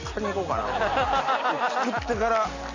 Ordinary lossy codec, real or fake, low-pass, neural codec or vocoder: AAC, 48 kbps; real; 7.2 kHz; none